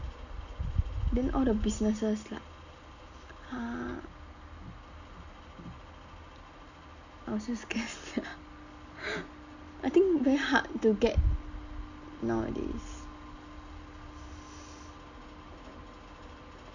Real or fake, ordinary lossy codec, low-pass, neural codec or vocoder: real; none; 7.2 kHz; none